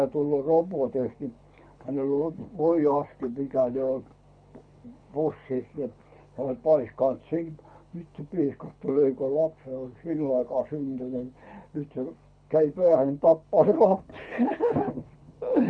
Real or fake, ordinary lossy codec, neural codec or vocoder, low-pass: fake; none; codec, 24 kHz, 6 kbps, HILCodec; 9.9 kHz